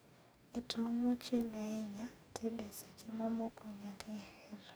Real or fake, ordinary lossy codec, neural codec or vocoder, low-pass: fake; none; codec, 44.1 kHz, 2.6 kbps, DAC; none